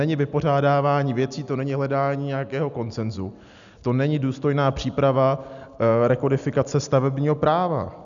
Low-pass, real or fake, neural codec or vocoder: 7.2 kHz; real; none